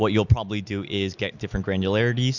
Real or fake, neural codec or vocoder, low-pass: real; none; 7.2 kHz